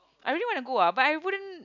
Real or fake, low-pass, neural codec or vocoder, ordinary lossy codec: real; 7.2 kHz; none; none